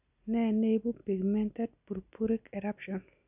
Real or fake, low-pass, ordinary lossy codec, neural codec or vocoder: real; 3.6 kHz; none; none